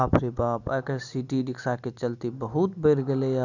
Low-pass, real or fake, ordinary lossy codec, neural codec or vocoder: 7.2 kHz; real; none; none